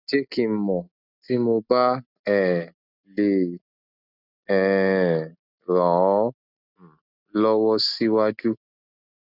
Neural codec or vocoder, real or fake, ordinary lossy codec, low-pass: none; real; none; 5.4 kHz